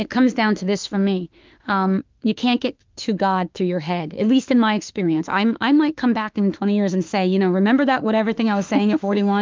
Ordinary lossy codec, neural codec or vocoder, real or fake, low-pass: Opus, 24 kbps; autoencoder, 48 kHz, 32 numbers a frame, DAC-VAE, trained on Japanese speech; fake; 7.2 kHz